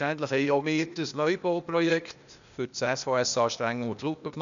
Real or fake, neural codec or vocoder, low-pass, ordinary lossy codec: fake; codec, 16 kHz, 0.8 kbps, ZipCodec; 7.2 kHz; none